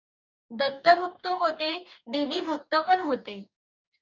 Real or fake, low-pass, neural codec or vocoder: fake; 7.2 kHz; codec, 44.1 kHz, 2.6 kbps, DAC